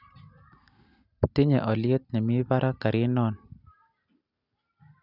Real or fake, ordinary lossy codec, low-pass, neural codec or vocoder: real; none; 5.4 kHz; none